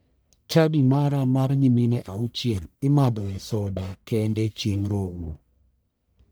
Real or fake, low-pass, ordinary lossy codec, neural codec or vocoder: fake; none; none; codec, 44.1 kHz, 1.7 kbps, Pupu-Codec